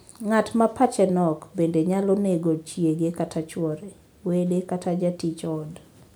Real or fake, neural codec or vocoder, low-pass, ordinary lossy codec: real; none; none; none